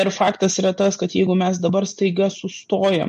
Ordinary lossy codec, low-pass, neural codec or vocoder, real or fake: MP3, 48 kbps; 10.8 kHz; none; real